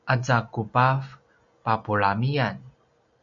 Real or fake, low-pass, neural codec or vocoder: real; 7.2 kHz; none